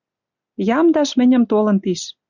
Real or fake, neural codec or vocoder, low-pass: real; none; 7.2 kHz